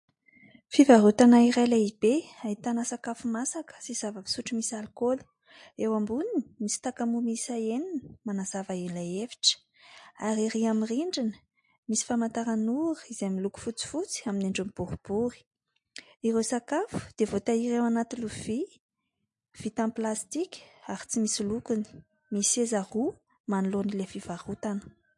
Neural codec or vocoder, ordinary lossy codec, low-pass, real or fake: none; MP3, 48 kbps; 10.8 kHz; real